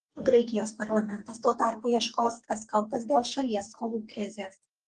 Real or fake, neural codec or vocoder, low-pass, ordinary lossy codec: fake; codec, 44.1 kHz, 2.6 kbps, DAC; 10.8 kHz; Opus, 32 kbps